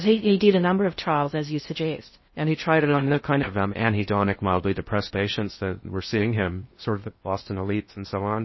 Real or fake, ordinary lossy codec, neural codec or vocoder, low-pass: fake; MP3, 24 kbps; codec, 16 kHz in and 24 kHz out, 0.6 kbps, FocalCodec, streaming, 4096 codes; 7.2 kHz